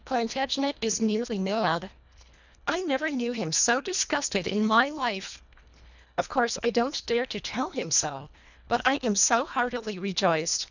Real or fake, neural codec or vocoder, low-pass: fake; codec, 24 kHz, 1.5 kbps, HILCodec; 7.2 kHz